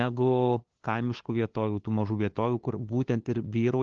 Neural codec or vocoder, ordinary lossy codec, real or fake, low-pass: codec, 16 kHz, 2 kbps, FunCodec, trained on LibriTTS, 25 frames a second; Opus, 16 kbps; fake; 7.2 kHz